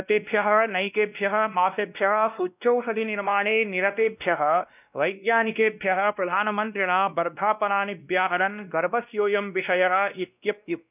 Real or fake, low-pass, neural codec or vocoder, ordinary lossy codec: fake; 3.6 kHz; codec, 16 kHz, 1 kbps, X-Codec, WavLM features, trained on Multilingual LibriSpeech; none